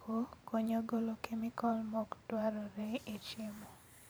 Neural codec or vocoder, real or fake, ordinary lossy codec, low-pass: vocoder, 44.1 kHz, 128 mel bands every 512 samples, BigVGAN v2; fake; none; none